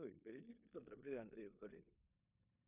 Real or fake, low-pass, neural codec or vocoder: fake; 3.6 kHz; codec, 16 kHz in and 24 kHz out, 0.9 kbps, LongCat-Audio-Codec, four codebook decoder